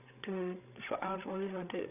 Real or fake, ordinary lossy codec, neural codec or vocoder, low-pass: fake; none; codec, 16 kHz, 16 kbps, FreqCodec, larger model; 3.6 kHz